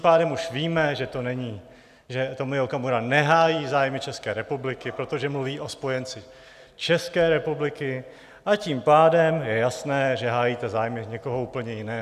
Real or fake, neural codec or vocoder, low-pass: real; none; 14.4 kHz